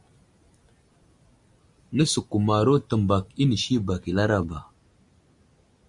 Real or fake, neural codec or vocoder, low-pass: real; none; 10.8 kHz